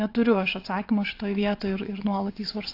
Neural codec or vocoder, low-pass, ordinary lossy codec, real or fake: vocoder, 22.05 kHz, 80 mel bands, WaveNeXt; 5.4 kHz; AAC, 32 kbps; fake